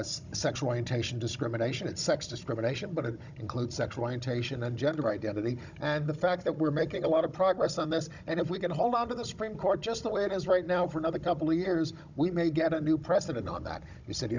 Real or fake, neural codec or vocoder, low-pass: fake; codec, 16 kHz, 16 kbps, FunCodec, trained on Chinese and English, 50 frames a second; 7.2 kHz